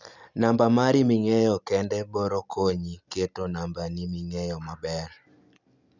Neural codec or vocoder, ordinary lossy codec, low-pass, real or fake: none; none; 7.2 kHz; real